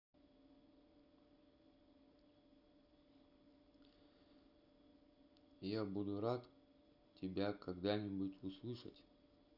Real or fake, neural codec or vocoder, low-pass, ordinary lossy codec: real; none; 5.4 kHz; none